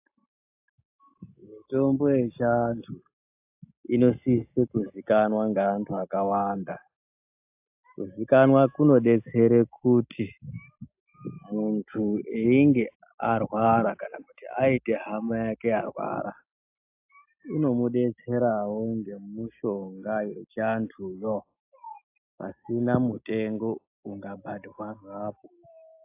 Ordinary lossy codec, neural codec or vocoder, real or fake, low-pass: AAC, 32 kbps; none; real; 3.6 kHz